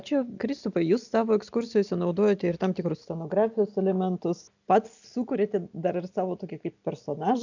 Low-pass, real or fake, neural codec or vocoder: 7.2 kHz; real; none